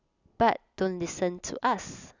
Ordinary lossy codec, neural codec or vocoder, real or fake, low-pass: none; none; real; 7.2 kHz